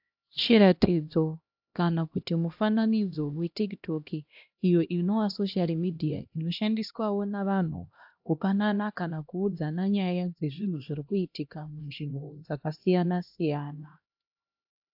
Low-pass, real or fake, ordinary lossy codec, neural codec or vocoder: 5.4 kHz; fake; AAC, 48 kbps; codec, 16 kHz, 1 kbps, X-Codec, HuBERT features, trained on LibriSpeech